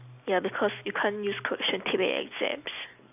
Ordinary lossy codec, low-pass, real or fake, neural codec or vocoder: none; 3.6 kHz; real; none